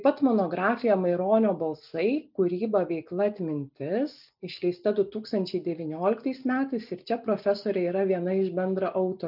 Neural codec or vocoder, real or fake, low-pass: none; real; 5.4 kHz